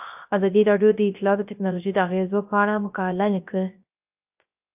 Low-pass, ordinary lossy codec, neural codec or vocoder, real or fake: 3.6 kHz; AAC, 32 kbps; codec, 16 kHz, 0.3 kbps, FocalCodec; fake